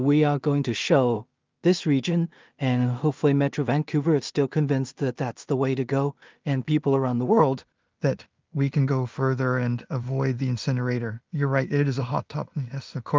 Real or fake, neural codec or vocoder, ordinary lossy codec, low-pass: fake; codec, 16 kHz in and 24 kHz out, 0.4 kbps, LongCat-Audio-Codec, two codebook decoder; Opus, 24 kbps; 7.2 kHz